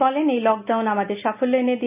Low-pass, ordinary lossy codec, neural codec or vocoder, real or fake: 3.6 kHz; none; none; real